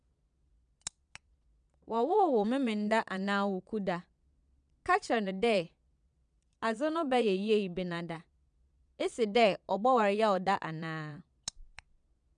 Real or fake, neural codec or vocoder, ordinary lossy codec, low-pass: fake; vocoder, 22.05 kHz, 80 mel bands, Vocos; none; 9.9 kHz